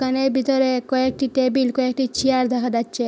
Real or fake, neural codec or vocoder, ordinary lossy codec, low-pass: real; none; none; none